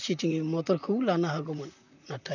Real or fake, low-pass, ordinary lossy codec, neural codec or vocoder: fake; 7.2 kHz; none; vocoder, 44.1 kHz, 128 mel bands, Pupu-Vocoder